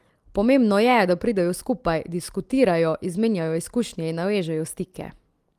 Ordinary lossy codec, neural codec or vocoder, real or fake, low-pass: Opus, 24 kbps; none; real; 14.4 kHz